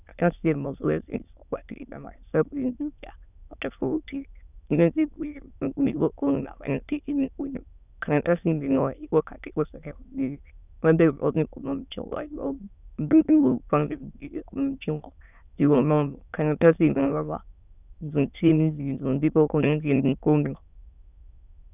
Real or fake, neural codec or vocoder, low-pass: fake; autoencoder, 22.05 kHz, a latent of 192 numbers a frame, VITS, trained on many speakers; 3.6 kHz